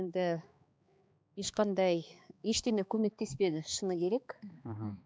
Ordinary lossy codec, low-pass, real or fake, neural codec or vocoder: none; none; fake; codec, 16 kHz, 2 kbps, X-Codec, HuBERT features, trained on balanced general audio